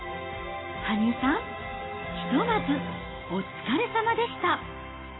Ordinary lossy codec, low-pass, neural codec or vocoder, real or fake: AAC, 16 kbps; 7.2 kHz; none; real